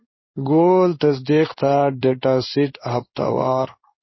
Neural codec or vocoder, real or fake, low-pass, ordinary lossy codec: codec, 16 kHz in and 24 kHz out, 1 kbps, XY-Tokenizer; fake; 7.2 kHz; MP3, 24 kbps